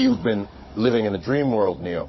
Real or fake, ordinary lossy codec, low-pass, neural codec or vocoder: fake; MP3, 24 kbps; 7.2 kHz; codec, 16 kHz, 16 kbps, FunCodec, trained on Chinese and English, 50 frames a second